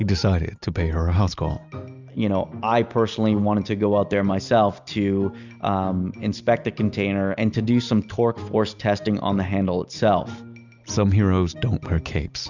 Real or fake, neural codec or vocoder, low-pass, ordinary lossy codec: real; none; 7.2 kHz; Opus, 64 kbps